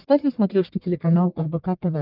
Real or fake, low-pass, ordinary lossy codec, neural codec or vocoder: fake; 5.4 kHz; Opus, 24 kbps; codec, 44.1 kHz, 1.7 kbps, Pupu-Codec